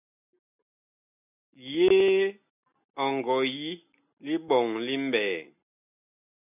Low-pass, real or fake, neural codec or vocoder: 3.6 kHz; real; none